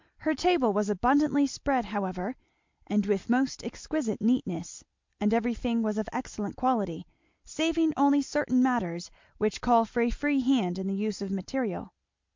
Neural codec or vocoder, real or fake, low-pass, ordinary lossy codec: none; real; 7.2 kHz; MP3, 64 kbps